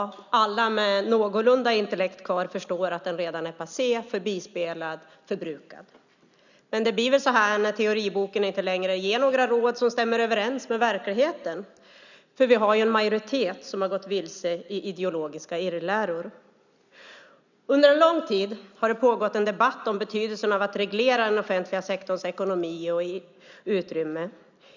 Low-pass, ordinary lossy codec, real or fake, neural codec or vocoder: 7.2 kHz; none; real; none